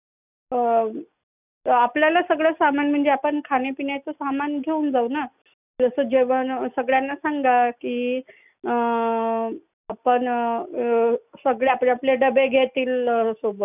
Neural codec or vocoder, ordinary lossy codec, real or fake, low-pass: none; none; real; 3.6 kHz